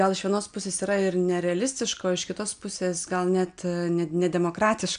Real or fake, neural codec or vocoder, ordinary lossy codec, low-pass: real; none; MP3, 96 kbps; 9.9 kHz